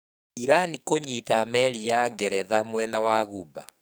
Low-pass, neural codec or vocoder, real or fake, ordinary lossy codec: none; codec, 44.1 kHz, 2.6 kbps, SNAC; fake; none